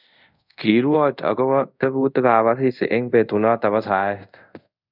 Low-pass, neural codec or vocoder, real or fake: 5.4 kHz; codec, 24 kHz, 0.5 kbps, DualCodec; fake